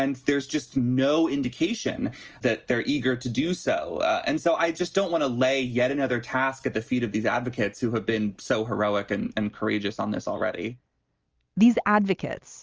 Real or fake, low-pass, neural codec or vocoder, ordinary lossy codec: real; 7.2 kHz; none; Opus, 24 kbps